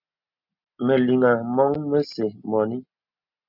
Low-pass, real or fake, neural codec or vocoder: 5.4 kHz; real; none